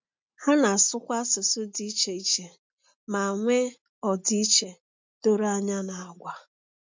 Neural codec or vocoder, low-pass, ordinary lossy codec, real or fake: none; 7.2 kHz; MP3, 64 kbps; real